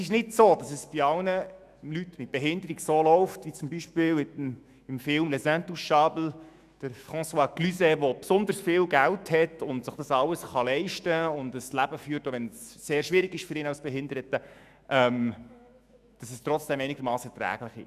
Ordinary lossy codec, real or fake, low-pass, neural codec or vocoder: none; fake; 14.4 kHz; autoencoder, 48 kHz, 128 numbers a frame, DAC-VAE, trained on Japanese speech